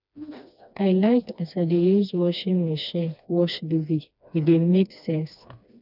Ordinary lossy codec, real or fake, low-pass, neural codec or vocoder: none; fake; 5.4 kHz; codec, 16 kHz, 2 kbps, FreqCodec, smaller model